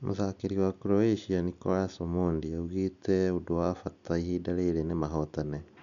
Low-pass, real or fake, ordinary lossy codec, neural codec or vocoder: 7.2 kHz; real; none; none